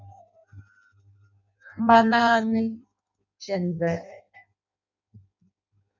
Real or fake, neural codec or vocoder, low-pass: fake; codec, 16 kHz in and 24 kHz out, 0.6 kbps, FireRedTTS-2 codec; 7.2 kHz